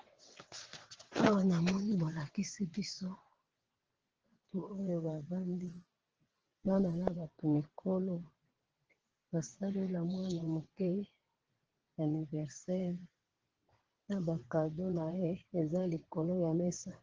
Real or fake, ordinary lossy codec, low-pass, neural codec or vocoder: fake; Opus, 16 kbps; 7.2 kHz; vocoder, 22.05 kHz, 80 mel bands, WaveNeXt